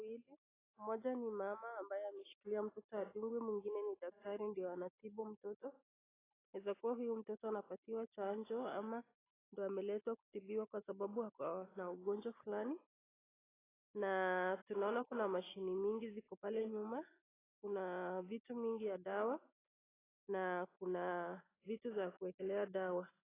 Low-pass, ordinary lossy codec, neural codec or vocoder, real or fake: 3.6 kHz; AAC, 16 kbps; none; real